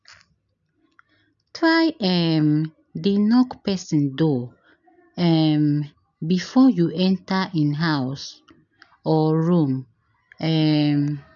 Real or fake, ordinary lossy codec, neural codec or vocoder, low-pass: real; none; none; 7.2 kHz